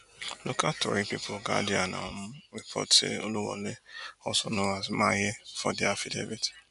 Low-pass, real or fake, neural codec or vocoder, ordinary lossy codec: 10.8 kHz; real; none; none